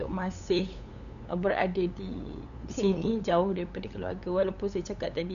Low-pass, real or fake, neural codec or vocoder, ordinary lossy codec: 7.2 kHz; fake; codec, 16 kHz, 8 kbps, FunCodec, trained on LibriTTS, 25 frames a second; none